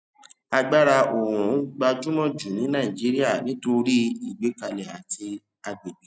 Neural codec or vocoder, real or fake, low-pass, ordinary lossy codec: none; real; none; none